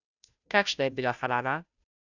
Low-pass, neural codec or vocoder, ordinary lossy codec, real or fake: 7.2 kHz; codec, 16 kHz, 0.5 kbps, FunCodec, trained on Chinese and English, 25 frames a second; none; fake